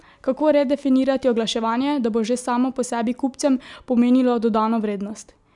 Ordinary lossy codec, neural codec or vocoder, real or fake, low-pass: none; none; real; 10.8 kHz